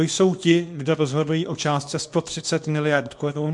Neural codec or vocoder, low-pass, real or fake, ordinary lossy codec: codec, 24 kHz, 0.9 kbps, WavTokenizer, small release; 10.8 kHz; fake; MP3, 64 kbps